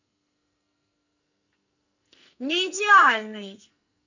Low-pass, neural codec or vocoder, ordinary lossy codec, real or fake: 7.2 kHz; codec, 44.1 kHz, 2.6 kbps, SNAC; none; fake